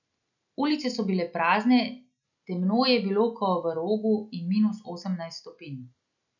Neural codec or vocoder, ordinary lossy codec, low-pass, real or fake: none; none; 7.2 kHz; real